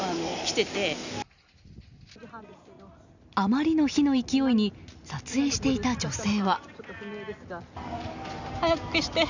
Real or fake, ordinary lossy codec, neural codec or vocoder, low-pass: real; none; none; 7.2 kHz